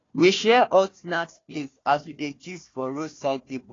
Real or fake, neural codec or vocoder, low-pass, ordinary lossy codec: fake; codec, 16 kHz, 1 kbps, FunCodec, trained on Chinese and English, 50 frames a second; 7.2 kHz; AAC, 32 kbps